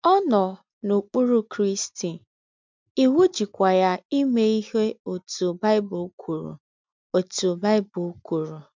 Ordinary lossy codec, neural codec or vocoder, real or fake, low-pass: MP3, 64 kbps; none; real; 7.2 kHz